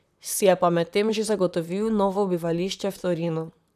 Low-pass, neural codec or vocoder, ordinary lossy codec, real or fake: 14.4 kHz; vocoder, 44.1 kHz, 128 mel bands, Pupu-Vocoder; none; fake